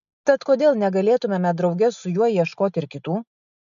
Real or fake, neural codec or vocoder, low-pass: real; none; 7.2 kHz